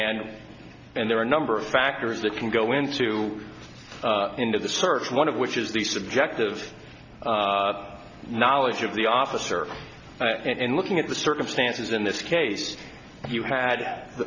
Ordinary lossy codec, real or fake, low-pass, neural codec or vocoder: Opus, 64 kbps; real; 7.2 kHz; none